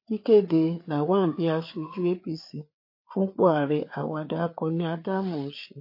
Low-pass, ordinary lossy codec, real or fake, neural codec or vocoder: 5.4 kHz; MP3, 32 kbps; fake; codec, 16 kHz, 4 kbps, FreqCodec, larger model